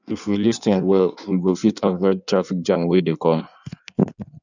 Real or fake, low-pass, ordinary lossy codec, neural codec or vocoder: fake; 7.2 kHz; none; codec, 16 kHz in and 24 kHz out, 1.1 kbps, FireRedTTS-2 codec